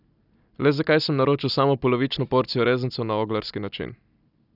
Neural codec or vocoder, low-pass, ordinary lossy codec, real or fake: none; 5.4 kHz; none; real